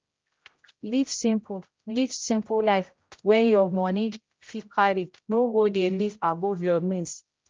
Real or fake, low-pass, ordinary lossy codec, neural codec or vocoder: fake; 7.2 kHz; Opus, 24 kbps; codec, 16 kHz, 0.5 kbps, X-Codec, HuBERT features, trained on general audio